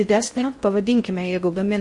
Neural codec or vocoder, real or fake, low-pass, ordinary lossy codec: codec, 16 kHz in and 24 kHz out, 0.6 kbps, FocalCodec, streaming, 4096 codes; fake; 10.8 kHz; MP3, 48 kbps